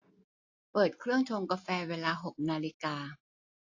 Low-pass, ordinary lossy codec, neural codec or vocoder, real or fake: 7.2 kHz; AAC, 48 kbps; none; real